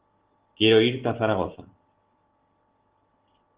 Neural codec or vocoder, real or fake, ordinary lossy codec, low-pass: none; real; Opus, 16 kbps; 3.6 kHz